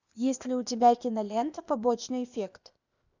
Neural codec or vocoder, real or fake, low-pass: codec, 16 kHz, 0.8 kbps, ZipCodec; fake; 7.2 kHz